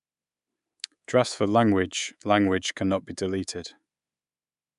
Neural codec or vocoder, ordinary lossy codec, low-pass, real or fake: codec, 24 kHz, 3.1 kbps, DualCodec; MP3, 96 kbps; 10.8 kHz; fake